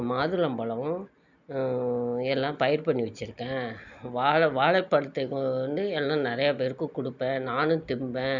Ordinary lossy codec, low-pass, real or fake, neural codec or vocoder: none; 7.2 kHz; real; none